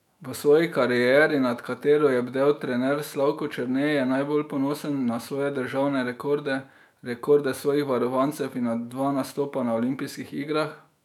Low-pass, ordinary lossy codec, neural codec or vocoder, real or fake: 19.8 kHz; none; autoencoder, 48 kHz, 128 numbers a frame, DAC-VAE, trained on Japanese speech; fake